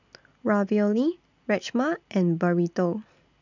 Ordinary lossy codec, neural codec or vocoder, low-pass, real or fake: none; none; 7.2 kHz; real